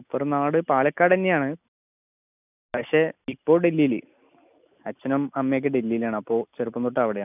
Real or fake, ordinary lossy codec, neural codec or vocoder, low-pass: real; none; none; 3.6 kHz